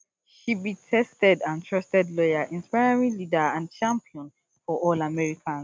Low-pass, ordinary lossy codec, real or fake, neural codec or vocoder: none; none; real; none